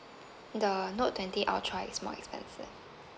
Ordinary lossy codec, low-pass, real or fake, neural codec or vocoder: none; none; real; none